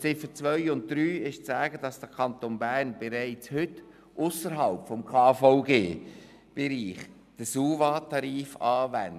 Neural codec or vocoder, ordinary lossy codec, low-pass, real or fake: none; none; 14.4 kHz; real